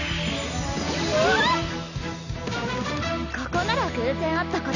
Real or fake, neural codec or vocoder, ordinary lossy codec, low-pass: real; none; none; 7.2 kHz